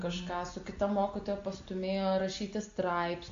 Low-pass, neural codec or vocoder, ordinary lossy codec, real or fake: 7.2 kHz; none; AAC, 48 kbps; real